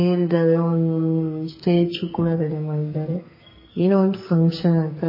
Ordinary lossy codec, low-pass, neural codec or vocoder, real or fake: MP3, 24 kbps; 5.4 kHz; codec, 44.1 kHz, 2.6 kbps, SNAC; fake